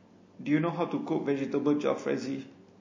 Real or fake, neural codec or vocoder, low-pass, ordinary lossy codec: real; none; 7.2 kHz; MP3, 32 kbps